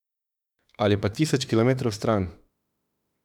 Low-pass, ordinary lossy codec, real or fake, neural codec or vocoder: 19.8 kHz; none; fake; autoencoder, 48 kHz, 32 numbers a frame, DAC-VAE, trained on Japanese speech